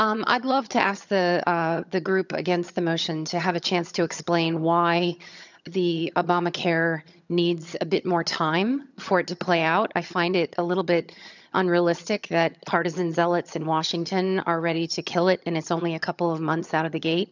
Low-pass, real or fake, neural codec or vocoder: 7.2 kHz; fake; vocoder, 22.05 kHz, 80 mel bands, HiFi-GAN